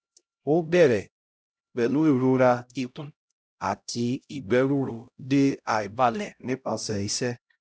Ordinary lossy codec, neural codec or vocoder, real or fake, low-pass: none; codec, 16 kHz, 0.5 kbps, X-Codec, HuBERT features, trained on LibriSpeech; fake; none